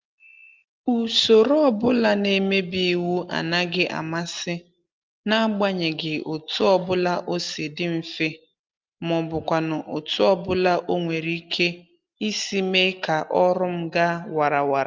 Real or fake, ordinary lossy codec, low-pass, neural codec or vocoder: real; Opus, 24 kbps; 7.2 kHz; none